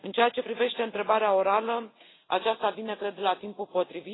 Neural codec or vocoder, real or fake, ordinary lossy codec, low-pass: none; real; AAC, 16 kbps; 7.2 kHz